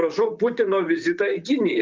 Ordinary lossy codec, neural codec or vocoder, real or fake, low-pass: Opus, 24 kbps; vocoder, 44.1 kHz, 128 mel bands, Pupu-Vocoder; fake; 7.2 kHz